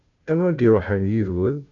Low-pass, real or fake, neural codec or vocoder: 7.2 kHz; fake; codec, 16 kHz, 0.5 kbps, FunCodec, trained on Chinese and English, 25 frames a second